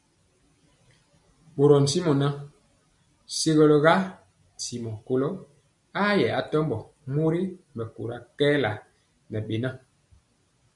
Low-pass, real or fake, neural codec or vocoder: 10.8 kHz; real; none